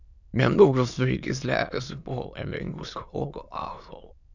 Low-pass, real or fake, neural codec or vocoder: 7.2 kHz; fake; autoencoder, 22.05 kHz, a latent of 192 numbers a frame, VITS, trained on many speakers